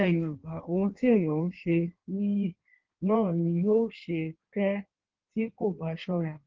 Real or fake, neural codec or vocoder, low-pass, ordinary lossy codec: fake; codec, 16 kHz in and 24 kHz out, 1.1 kbps, FireRedTTS-2 codec; 7.2 kHz; Opus, 16 kbps